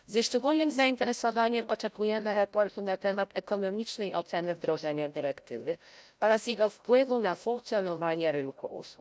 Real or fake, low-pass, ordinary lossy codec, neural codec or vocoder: fake; none; none; codec, 16 kHz, 0.5 kbps, FreqCodec, larger model